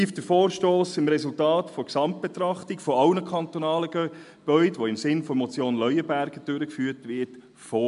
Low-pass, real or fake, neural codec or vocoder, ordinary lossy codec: 10.8 kHz; real; none; MP3, 64 kbps